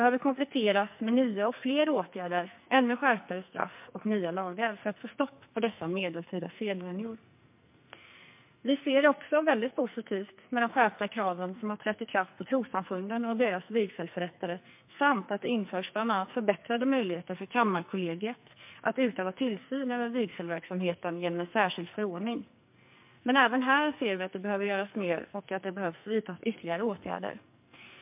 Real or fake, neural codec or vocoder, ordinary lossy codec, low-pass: fake; codec, 44.1 kHz, 2.6 kbps, SNAC; MP3, 32 kbps; 3.6 kHz